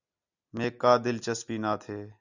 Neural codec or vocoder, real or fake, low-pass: none; real; 7.2 kHz